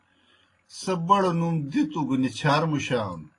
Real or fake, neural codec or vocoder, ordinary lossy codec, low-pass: real; none; AAC, 32 kbps; 10.8 kHz